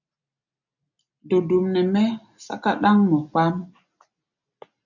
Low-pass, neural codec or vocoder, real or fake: 7.2 kHz; none; real